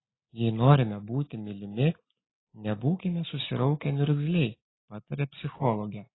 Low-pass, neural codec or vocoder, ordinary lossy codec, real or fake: 7.2 kHz; none; AAC, 16 kbps; real